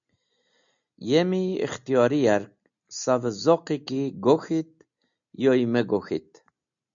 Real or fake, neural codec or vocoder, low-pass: real; none; 7.2 kHz